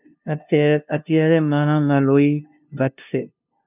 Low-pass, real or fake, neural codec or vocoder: 3.6 kHz; fake; codec, 16 kHz, 0.5 kbps, FunCodec, trained on LibriTTS, 25 frames a second